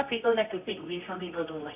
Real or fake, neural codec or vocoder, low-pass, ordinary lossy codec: fake; codec, 24 kHz, 0.9 kbps, WavTokenizer, medium music audio release; 3.6 kHz; AAC, 32 kbps